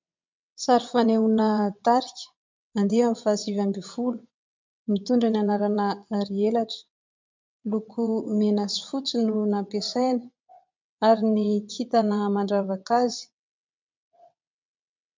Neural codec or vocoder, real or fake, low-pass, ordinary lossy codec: vocoder, 22.05 kHz, 80 mel bands, WaveNeXt; fake; 7.2 kHz; MP3, 64 kbps